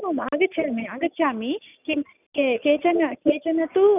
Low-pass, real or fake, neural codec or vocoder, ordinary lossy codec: 3.6 kHz; real; none; none